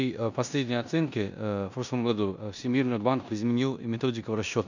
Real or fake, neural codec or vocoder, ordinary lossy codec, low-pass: fake; codec, 16 kHz in and 24 kHz out, 0.9 kbps, LongCat-Audio-Codec, four codebook decoder; none; 7.2 kHz